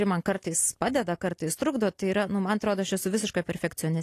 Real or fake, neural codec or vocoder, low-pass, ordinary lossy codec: real; none; 14.4 kHz; AAC, 48 kbps